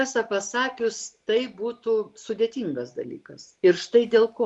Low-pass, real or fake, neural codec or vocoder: 10.8 kHz; real; none